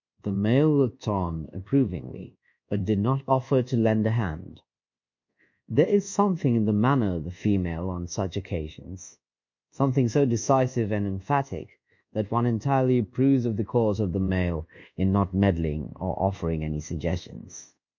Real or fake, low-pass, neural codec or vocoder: fake; 7.2 kHz; codec, 24 kHz, 1.2 kbps, DualCodec